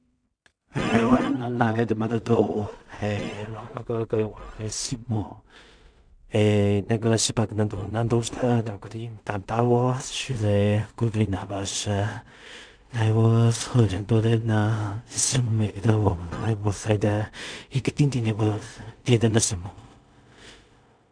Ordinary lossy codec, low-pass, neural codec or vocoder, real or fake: none; 9.9 kHz; codec, 16 kHz in and 24 kHz out, 0.4 kbps, LongCat-Audio-Codec, two codebook decoder; fake